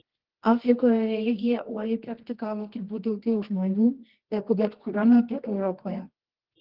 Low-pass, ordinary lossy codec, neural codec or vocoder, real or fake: 5.4 kHz; Opus, 16 kbps; codec, 24 kHz, 0.9 kbps, WavTokenizer, medium music audio release; fake